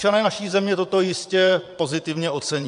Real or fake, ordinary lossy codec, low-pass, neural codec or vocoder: fake; MP3, 64 kbps; 9.9 kHz; vocoder, 22.05 kHz, 80 mel bands, Vocos